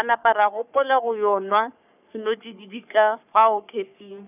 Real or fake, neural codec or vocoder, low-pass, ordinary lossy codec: fake; codec, 44.1 kHz, 3.4 kbps, Pupu-Codec; 3.6 kHz; none